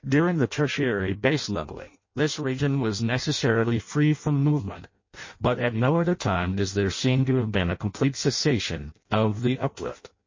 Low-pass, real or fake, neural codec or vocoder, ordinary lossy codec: 7.2 kHz; fake; codec, 16 kHz in and 24 kHz out, 0.6 kbps, FireRedTTS-2 codec; MP3, 32 kbps